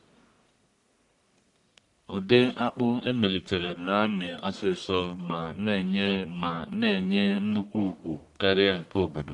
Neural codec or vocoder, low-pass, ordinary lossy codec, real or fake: codec, 44.1 kHz, 1.7 kbps, Pupu-Codec; 10.8 kHz; none; fake